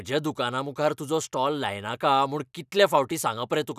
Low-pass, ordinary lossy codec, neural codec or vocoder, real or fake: 14.4 kHz; none; none; real